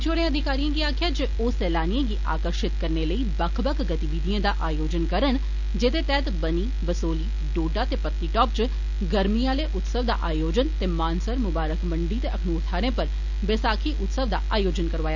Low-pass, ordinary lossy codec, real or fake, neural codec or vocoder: 7.2 kHz; none; real; none